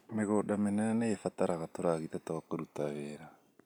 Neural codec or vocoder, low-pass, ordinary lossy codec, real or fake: none; 19.8 kHz; none; real